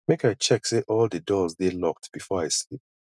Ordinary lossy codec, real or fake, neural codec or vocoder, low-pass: none; real; none; none